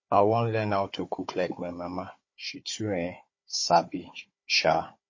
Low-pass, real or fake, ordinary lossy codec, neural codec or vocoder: 7.2 kHz; fake; MP3, 32 kbps; codec, 16 kHz, 4 kbps, FunCodec, trained on Chinese and English, 50 frames a second